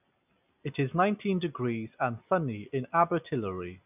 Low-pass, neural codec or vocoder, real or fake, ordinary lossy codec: 3.6 kHz; none; real; none